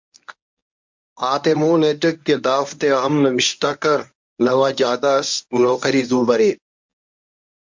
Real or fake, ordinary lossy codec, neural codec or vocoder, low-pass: fake; MP3, 64 kbps; codec, 24 kHz, 0.9 kbps, WavTokenizer, medium speech release version 1; 7.2 kHz